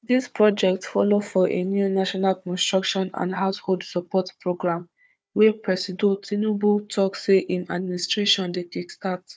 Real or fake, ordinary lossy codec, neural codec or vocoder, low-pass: fake; none; codec, 16 kHz, 4 kbps, FunCodec, trained on Chinese and English, 50 frames a second; none